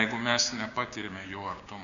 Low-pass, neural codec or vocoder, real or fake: 7.2 kHz; codec, 16 kHz, 6 kbps, DAC; fake